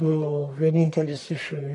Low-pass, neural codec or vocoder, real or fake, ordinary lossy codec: 10.8 kHz; codec, 44.1 kHz, 1.7 kbps, Pupu-Codec; fake; AAC, 48 kbps